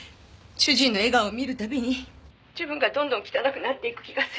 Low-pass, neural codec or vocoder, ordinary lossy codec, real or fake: none; none; none; real